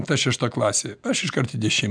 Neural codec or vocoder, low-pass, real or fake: none; 9.9 kHz; real